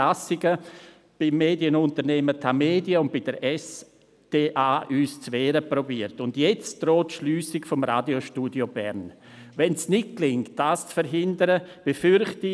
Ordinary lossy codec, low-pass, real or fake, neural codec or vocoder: none; none; real; none